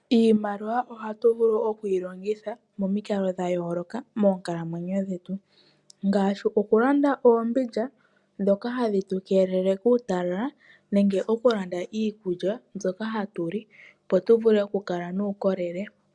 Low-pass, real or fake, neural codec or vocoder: 10.8 kHz; real; none